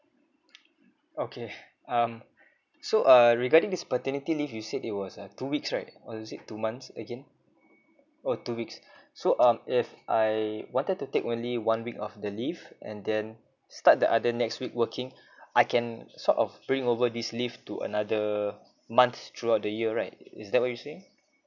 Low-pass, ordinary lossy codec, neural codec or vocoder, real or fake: 7.2 kHz; none; none; real